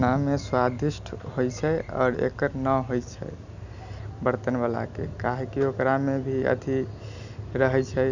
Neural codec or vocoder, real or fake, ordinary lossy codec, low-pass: none; real; none; 7.2 kHz